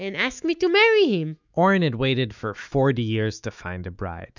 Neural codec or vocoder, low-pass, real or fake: autoencoder, 48 kHz, 128 numbers a frame, DAC-VAE, trained on Japanese speech; 7.2 kHz; fake